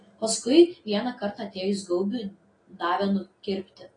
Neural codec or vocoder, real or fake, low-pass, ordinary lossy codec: none; real; 9.9 kHz; AAC, 32 kbps